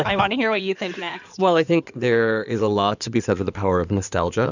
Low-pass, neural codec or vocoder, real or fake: 7.2 kHz; codec, 16 kHz in and 24 kHz out, 2.2 kbps, FireRedTTS-2 codec; fake